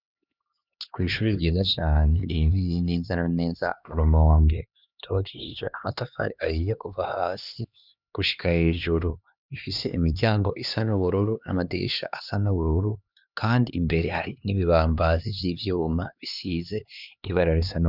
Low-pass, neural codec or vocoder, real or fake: 5.4 kHz; codec, 16 kHz, 2 kbps, X-Codec, HuBERT features, trained on LibriSpeech; fake